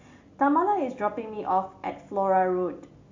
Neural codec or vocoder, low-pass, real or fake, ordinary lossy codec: none; 7.2 kHz; real; MP3, 48 kbps